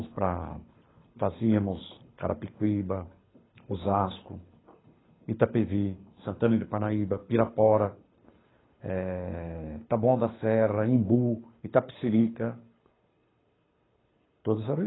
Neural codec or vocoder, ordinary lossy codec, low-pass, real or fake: codec, 24 kHz, 6 kbps, HILCodec; AAC, 16 kbps; 7.2 kHz; fake